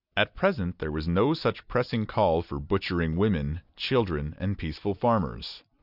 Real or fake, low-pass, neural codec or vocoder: real; 5.4 kHz; none